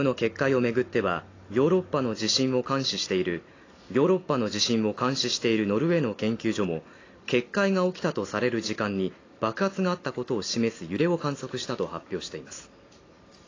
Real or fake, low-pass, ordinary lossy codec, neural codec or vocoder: real; 7.2 kHz; AAC, 32 kbps; none